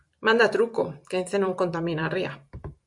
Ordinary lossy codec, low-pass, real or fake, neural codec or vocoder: MP3, 64 kbps; 10.8 kHz; fake; vocoder, 44.1 kHz, 128 mel bands every 256 samples, BigVGAN v2